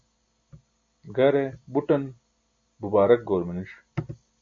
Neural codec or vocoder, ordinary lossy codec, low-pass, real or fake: none; MP3, 48 kbps; 7.2 kHz; real